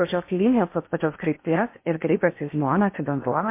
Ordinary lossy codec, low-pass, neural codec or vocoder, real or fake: MP3, 24 kbps; 3.6 kHz; codec, 16 kHz in and 24 kHz out, 0.8 kbps, FocalCodec, streaming, 65536 codes; fake